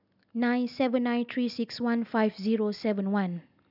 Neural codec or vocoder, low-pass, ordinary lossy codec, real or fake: none; 5.4 kHz; none; real